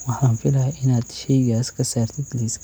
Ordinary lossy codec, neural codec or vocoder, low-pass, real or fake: none; none; none; real